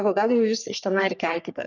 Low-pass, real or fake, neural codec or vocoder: 7.2 kHz; fake; codec, 44.1 kHz, 3.4 kbps, Pupu-Codec